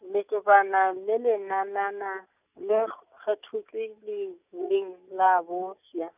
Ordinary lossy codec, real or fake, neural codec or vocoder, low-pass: none; real; none; 3.6 kHz